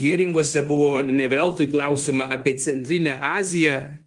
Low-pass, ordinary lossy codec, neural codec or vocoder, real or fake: 10.8 kHz; Opus, 32 kbps; codec, 16 kHz in and 24 kHz out, 0.9 kbps, LongCat-Audio-Codec, fine tuned four codebook decoder; fake